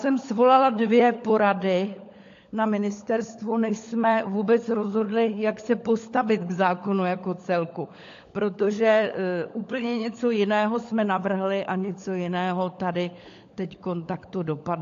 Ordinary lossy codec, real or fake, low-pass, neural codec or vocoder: AAC, 48 kbps; fake; 7.2 kHz; codec, 16 kHz, 16 kbps, FunCodec, trained on LibriTTS, 50 frames a second